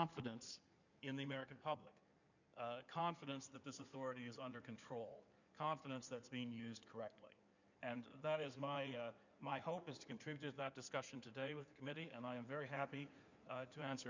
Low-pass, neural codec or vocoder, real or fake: 7.2 kHz; codec, 16 kHz in and 24 kHz out, 2.2 kbps, FireRedTTS-2 codec; fake